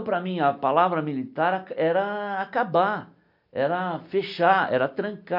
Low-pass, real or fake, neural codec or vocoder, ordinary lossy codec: 5.4 kHz; real; none; AAC, 48 kbps